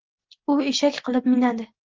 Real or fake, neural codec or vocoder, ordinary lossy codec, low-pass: real; none; Opus, 16 kbps; 7.2 kHz